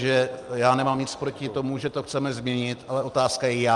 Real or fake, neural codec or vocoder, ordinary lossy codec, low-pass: real; none; Opus, 32 kbps; 10.8 kHz